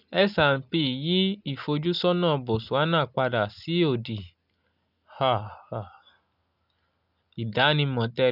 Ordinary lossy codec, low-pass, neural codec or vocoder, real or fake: none; 5.4 kHz; none; real